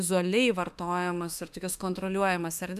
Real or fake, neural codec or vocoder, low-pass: fake; autoencoder, 48 kHz, 32 numbers a frame, DAC-VAE, trained on Japanese speech; 14.4 kHz